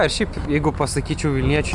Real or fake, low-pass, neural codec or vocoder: real; 10.8 kHz; none